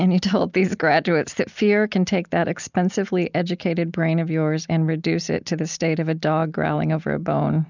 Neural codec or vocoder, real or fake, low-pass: none; real; 7.2 kHz